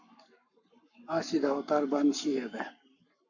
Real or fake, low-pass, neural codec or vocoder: fake; 7.2 kHz; codec, 44.1 kHz, 7.8 kbps, Pupu-Codec